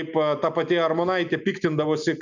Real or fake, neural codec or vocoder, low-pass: real; none; 7.2 kHz